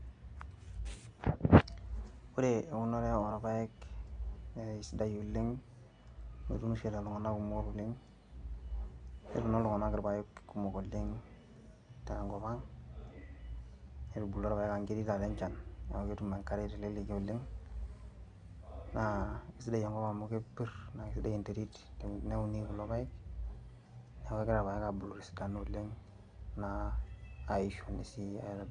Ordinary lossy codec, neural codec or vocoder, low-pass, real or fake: MP3, 64 kbps; none; 9.9 kHz; real